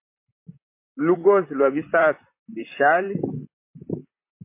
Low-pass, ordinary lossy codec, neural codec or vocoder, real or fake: 3.6 kHz; MP3, 24 kbps; vocoder, 44.1 kHz, 128 mel bands, Pupu-Vocoder; fake